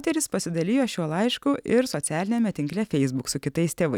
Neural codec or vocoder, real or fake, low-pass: none; real; 19.8 kHz